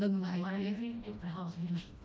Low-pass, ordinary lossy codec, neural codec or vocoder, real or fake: none; none; codec, 16 kHz, 1 kbps, FreqCodec, smaller model; fake